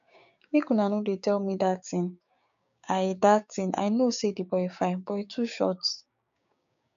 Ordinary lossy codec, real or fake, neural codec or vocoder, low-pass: none; fake; codec, 16 kHz, 6 kbps, DAC; 7.2 kHz